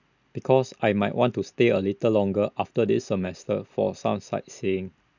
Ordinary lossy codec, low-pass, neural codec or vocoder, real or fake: none; 7.2 kHz; none; real